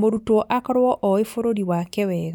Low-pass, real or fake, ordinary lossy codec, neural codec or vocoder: 19.8 kHz; real; none; none